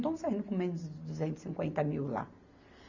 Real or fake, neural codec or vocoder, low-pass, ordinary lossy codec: real; none; 7.2 kHz; none